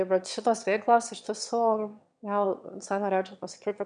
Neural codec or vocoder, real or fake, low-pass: autoencoder, 22.05 kHz, a latent of 192 numbers a frame, VITS, trained on one speaker; fake; 9.9 kHz